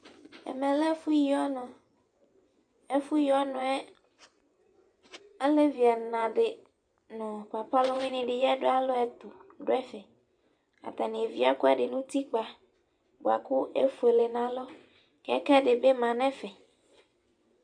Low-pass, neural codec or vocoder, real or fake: 9.9 kHz; vocoder, 24 kHz, 100 mel bands, Vocos; fake